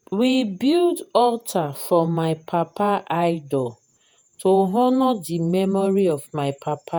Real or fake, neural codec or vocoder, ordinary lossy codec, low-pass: fake; vocoder, 48 kHz, 128 mel bands, Vocos; none; none